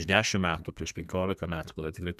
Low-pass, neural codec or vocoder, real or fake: 14.4 kHz; codec, 32 kHz, 1.9 kbps, SNAC; fake